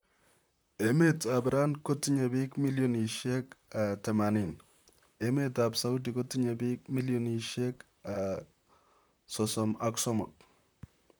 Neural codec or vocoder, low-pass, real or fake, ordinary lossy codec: vocoder, 44.1 kHz, 128 mel bands, Pupu-Vocoder; none; fake; none